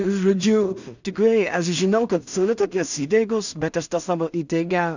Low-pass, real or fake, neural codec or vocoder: 7.2 kHz; fake; codec, 16 kHz in and 24 kHz out, 0.4 kbps, LongCat-Audio-Codec, two codebook decoder